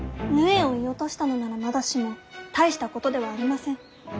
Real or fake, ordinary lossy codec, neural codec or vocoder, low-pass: real; none; none; none